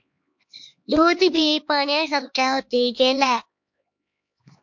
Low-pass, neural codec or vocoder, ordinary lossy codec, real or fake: 7.2 kHz; codec, 16 kHz, 2 kbps, X-Codec, HuBERT features, trained on LibriSpeech; MP3, 48 kbps; fake